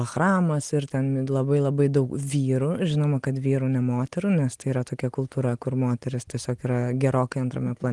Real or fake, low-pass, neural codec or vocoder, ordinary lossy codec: real; 10.8 kHz; none; Opus, 24 kbps